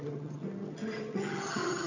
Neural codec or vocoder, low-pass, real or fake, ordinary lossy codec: vocoder, 22.05 kHz, 80 mel bands, HiFi-GAN; 7.2 kHz; fake; none